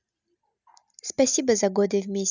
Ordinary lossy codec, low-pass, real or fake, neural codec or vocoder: none; 7.2 kHz; real; none